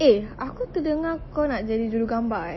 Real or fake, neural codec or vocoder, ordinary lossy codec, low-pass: real; none; MP3, 24 kbps; 7.2 kHz